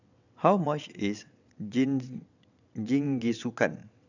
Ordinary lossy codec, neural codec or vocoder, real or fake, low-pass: none; vocoder, 22.05 kHz, 80 mel bands, WaveNeXt; fake; 7.2 kHz